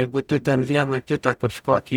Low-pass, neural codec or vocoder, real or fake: 19.8 kHz; codec, 44.1 kHz, 0.9 kbps, DAC; fake